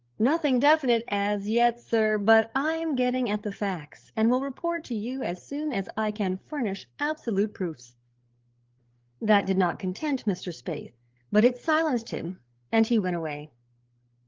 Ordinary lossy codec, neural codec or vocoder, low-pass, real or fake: Opus, 16 kbps; codec, 16 kHz, 8 kbps, FreqCodec, larger model; 7.2 kHz; fake